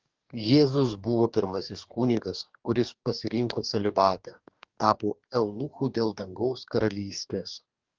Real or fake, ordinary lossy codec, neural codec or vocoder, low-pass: fake; Opus, 24 kbps; codec, 44.1 kHz, 2.6 kbps, DAC; 7.2 kHz